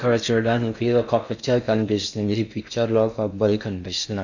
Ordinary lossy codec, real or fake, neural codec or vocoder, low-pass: none; fake; codec, 16 kHz in and 24 kHz out, 0.6 kbps, FocalCodec, streaming, 4096 codes; 7.2 kHz